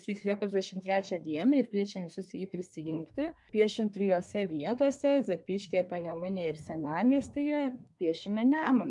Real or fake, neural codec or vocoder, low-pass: fake; codec, 24 kHz, 1 kbps, SNAC; 10.8 kHz